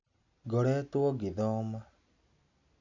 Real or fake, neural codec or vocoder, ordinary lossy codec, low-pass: real; none; none; 7.2 kHz